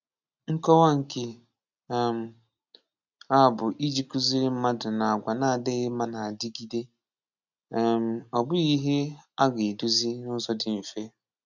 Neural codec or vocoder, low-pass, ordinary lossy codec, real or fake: none; 7.2 kHz; none; real